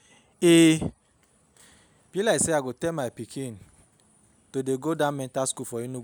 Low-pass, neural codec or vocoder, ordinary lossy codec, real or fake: none; none; none; real